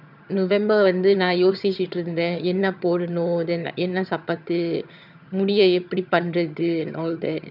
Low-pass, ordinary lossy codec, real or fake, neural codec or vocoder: 5.4 kHz; none; fake; vocoder, 22.05 kHz, 80 mel bands, HiFi-GAN